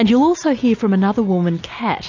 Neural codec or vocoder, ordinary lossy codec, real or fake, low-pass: none; AAC, 48 kbps; real; 7.2 kHz